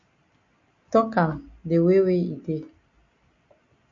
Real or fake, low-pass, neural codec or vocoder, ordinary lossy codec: real; 7.2 kHz; none; MP3, 48 kbps